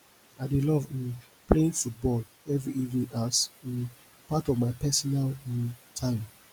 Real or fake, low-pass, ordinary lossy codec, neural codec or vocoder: real; none; none; none